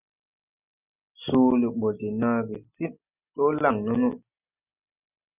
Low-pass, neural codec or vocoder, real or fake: 3.6 kHz; none; real